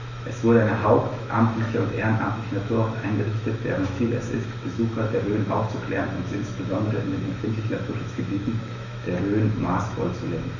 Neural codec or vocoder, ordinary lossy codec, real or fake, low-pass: autoencoder, 48 kHz, 128 numbers a frame, DAC-VAE, trained on Japanese speech; none; fake; 7.2 kHz